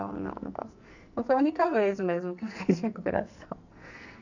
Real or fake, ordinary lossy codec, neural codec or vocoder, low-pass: fake; none; codec, 44.1 kHz, 2.6 kbps, SNAC; 7.2 kHz